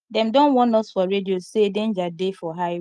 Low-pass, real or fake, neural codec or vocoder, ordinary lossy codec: 10.8 kHz; real; none; Opus, 24 kbps